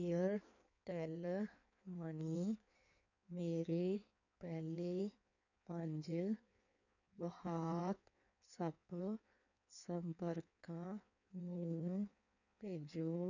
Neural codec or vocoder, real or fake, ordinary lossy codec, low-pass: codec, 16 kHz in and 24 kHz out, 1.1 kbps, FireRedTTS-2 codec; fake; none; 7.2 kHz